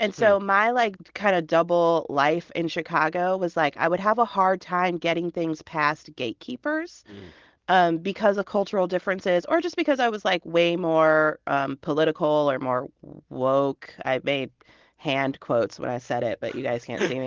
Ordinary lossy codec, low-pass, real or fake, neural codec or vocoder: Opus, 16 kbps; 7.2 kHz; real; none